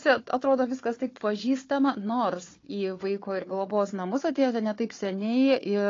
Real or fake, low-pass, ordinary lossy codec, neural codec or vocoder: fake; 7.2 kHz; AAC, 32 kbps; codec, 16 kHz, 4 kbps, FunCodec, trained on Chinese and English, 50 frames a second